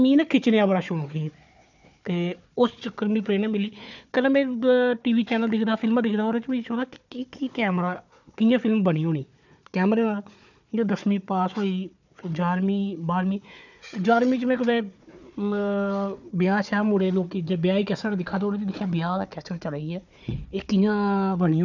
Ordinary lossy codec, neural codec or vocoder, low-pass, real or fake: none; codec, 16 kHz, 4 kbps, FunCodec, trained on Chinese and English, 50 frames a second; 7.2 kHz; fake